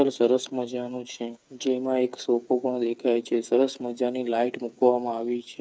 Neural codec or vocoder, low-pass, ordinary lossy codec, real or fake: codec, 16 kHz, 8 kbps, FreqCodec, smaller model; none; none; fake